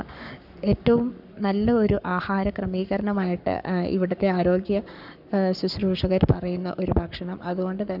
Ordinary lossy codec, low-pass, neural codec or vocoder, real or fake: none; 5.4 kHz; codec, 44.1 kHz, 7.8 kbps, Pupu-Codec; fake